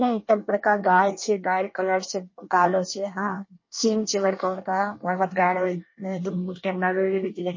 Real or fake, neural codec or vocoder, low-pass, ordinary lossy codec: fake; codec, 24 kHz, 1 kbps, SNAC; 7.2 kHz; MP3, 32 kbps